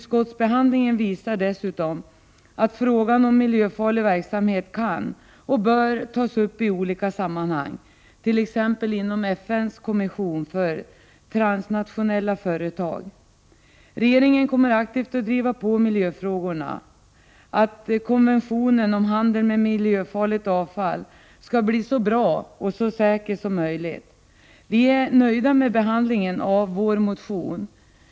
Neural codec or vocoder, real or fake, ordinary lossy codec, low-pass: none; real; none; none